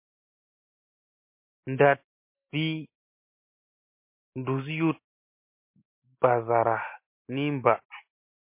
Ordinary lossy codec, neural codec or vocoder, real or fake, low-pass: MP3, 24 kbps; none; real; 3.6 kHz